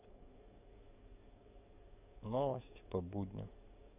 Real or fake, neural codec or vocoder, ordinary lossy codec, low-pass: fake; codec, 44.1 kHz, 7.8 kbps, DAC; none; 3.6 kHz